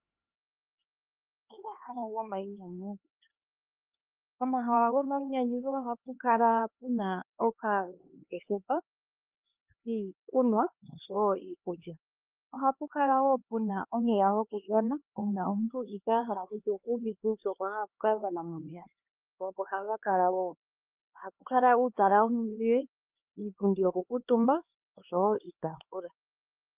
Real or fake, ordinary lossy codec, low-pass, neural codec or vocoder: fake; Opus, 24 kbps; 3.6 kHz; codec, 16 kHz, 2 kbps, X-Codec, HuBERT features, trained on LibriSpeech